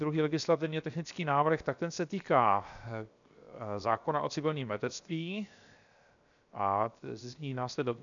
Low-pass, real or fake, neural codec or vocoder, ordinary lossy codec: 7.2 kHz; fake; codec, 16 kHz, 0.7 kbps, FocalCodec; MP3, 96 kbps